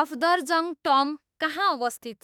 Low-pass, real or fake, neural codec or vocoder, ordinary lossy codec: 19.8 kHz; fake; autoencoder, 48 kHz, 32 numbers a frame, DAC-VAE, trained on Japanese speech; none